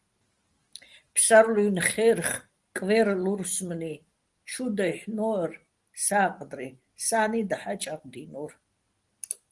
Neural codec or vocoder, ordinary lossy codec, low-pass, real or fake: none; Opus, 24 kbps; 10.8 kHz; real